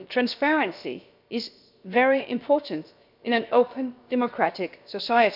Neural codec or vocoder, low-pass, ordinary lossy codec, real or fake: codec, 16 kHz, about 1 kbps, DyCAST, with the encoder's durations; 5.4 kHz; none; fake